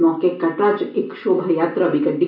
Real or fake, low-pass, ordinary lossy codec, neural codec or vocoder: real; 5.4 kHz; none; none